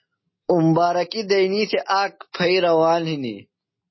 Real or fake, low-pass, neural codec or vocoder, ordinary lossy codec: real; 7.2 kHz; none; MP3, 24 kbps